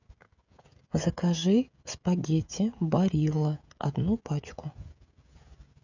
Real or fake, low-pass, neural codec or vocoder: fake; 7.2 kHz; codec, 16 kHz, 16 kbps, FreqCodec, smaller model